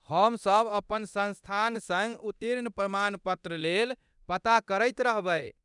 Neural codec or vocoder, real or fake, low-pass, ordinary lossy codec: codec, 24 kHz, 0.9 kbps, DualCodec; fake; 10.8 kHz; none